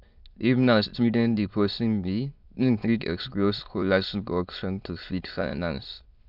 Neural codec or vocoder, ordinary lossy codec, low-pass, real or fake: autoencoder, 22.05 kHz, a latent of 192 numbers a frame, VITS, trained on many speakers; none; 5.4 kHz; fake